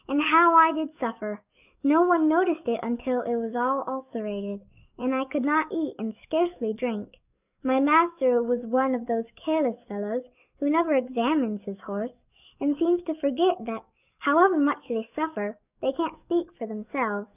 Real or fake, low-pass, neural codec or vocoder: fake; 3.6 kHz; codec, 44.1 kHz, 7.8 kbps, DAC